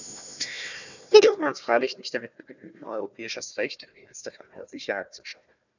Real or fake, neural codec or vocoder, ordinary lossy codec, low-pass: fake; codec, 16 kHz, 1 kbps, FunCodec, trained on Chinese and English, 50 frames a second; none; 7.2 kHz